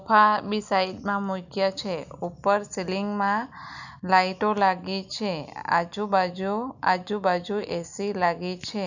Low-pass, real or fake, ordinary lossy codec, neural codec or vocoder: 7.2 kHz; real; none; none